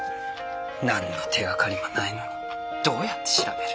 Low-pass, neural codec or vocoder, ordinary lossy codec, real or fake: none; none; none; real